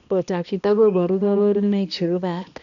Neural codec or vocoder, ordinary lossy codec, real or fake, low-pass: codec, 16 kHz, 1 kbps, X-Codec, HuBERT features, trained on balanced general audio; none; fake; 7.2 kHz